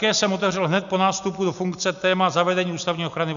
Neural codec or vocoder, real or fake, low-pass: none; real; 7.2 kHz